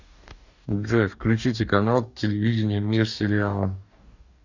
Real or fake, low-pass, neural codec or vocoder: fake; 7.2 kHz; codec, 44.1 kHz, 2.6 kbps, DAC